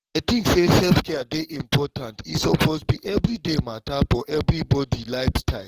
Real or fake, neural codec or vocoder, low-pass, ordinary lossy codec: fake; codec, 44.1 kHz, 7.8 kbps, DAC; 14.4 kHz; Opus, 16 kbps